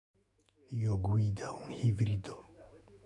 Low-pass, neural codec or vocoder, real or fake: 10.8 kHz; autoencoder, 48 kHz, 128 numbers a frame, DAC-VAE, trained on Japanese speech; fake